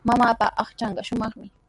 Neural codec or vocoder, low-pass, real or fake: none; 10.8 kHz; real